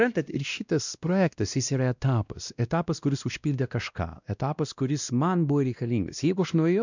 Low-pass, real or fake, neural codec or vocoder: 7.2 kHz; fake; codec, 16 kHz, 1 kbps, X-Codec, WavLM features, trained on Multilingual LibriSpeech